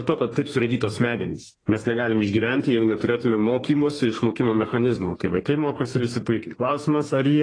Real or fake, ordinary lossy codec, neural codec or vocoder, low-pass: fake; AAC, 32 kbps; codec, 32 kHz, 1.9 kbps, SNAC; 9.9 kHz